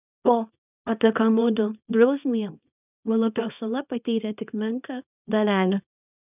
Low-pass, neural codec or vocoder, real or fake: 3.6 kHz; codec, 24 kHz, 0.9 kbps, WavTokenizer, small release; fake